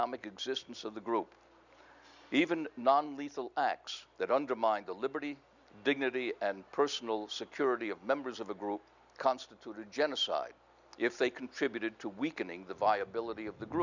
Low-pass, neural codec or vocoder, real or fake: 7.2 kHz; none; real